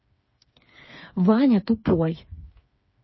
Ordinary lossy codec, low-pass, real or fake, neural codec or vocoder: MP3, 24 kbps; 7.2 kHz; fake; codec, 16 kHz, 4 kbps, FreqCodec, smaller model